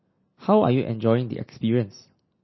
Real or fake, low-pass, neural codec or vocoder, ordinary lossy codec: real; 7.2 kHz; none; MP3, 24 kbps